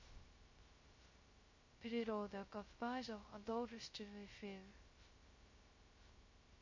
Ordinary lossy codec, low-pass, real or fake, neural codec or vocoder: MP3, 32 kbps; 7.2 kHz; fake; codec, 16 kHz, 0.2 kbps, FocalCodec